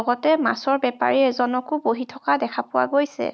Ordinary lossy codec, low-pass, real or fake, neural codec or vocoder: none; none; real; none